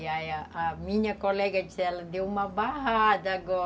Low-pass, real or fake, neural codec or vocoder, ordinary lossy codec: none; real; none; none